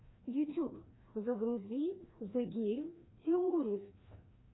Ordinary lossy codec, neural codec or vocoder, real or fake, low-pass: AAC, 16 kbps; codec, 16 kHz, 1 kbps, FreqCodec, larger model; fake; 7.2 kHz